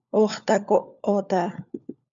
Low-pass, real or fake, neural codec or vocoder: 7.2 kHz; fake; codec, 16 kHz, 4 kbps, FunCodec, trained on LibriTTS, 50 frames a second